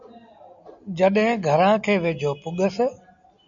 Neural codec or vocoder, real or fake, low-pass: none; real; 7.2 kHz